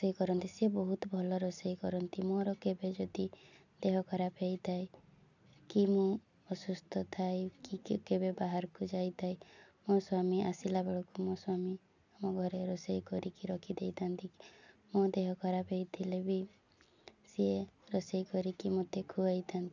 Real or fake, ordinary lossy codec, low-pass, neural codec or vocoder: real; none; 7.2 kHz; none